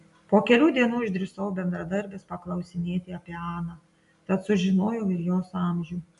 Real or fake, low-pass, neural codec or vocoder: real; 10.8 kHz; none